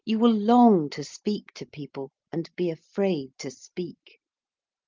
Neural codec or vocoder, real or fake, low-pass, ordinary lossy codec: none; real; 7.2 kHz; Opus, 32 kbps